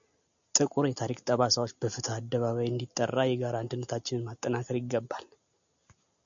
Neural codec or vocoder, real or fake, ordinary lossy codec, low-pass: none; real; MP3, 96 kbps; 7.2 kHz